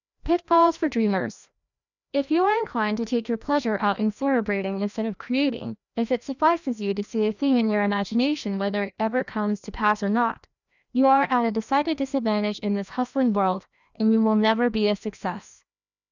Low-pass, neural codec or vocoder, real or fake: 7.2 kHz; codec, 16 kHz, 1 kbps, FreqCodec, larger model; fake